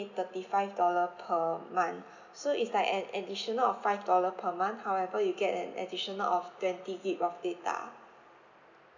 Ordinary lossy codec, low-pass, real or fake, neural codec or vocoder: none; 7.2 kHz; real; none